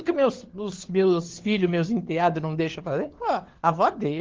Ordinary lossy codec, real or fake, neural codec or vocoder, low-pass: Opus, 16 kbps; fake; codec, 16 kHz, 4 kbps, FunCodec, trained on LibriTTS, 50 frames a second; 7.2 kHz